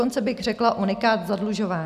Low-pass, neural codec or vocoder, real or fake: 14.4 kHz; none; real